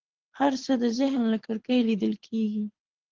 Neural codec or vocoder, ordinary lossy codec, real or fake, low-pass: codec, 24 kHz, 6 kbps, HILCodec; Opus, 16 kbps; fake; 7.2 kHz